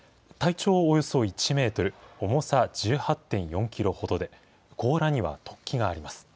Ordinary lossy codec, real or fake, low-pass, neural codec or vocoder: none; real; none; none